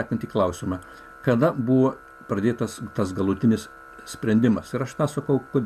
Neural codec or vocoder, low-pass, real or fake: none; 14.4 kHz; real